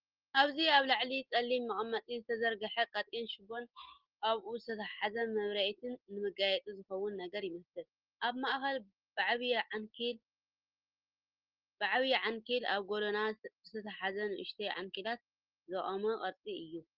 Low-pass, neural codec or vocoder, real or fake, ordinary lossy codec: 5.4 kHz; none; real; Opus, 16 kbps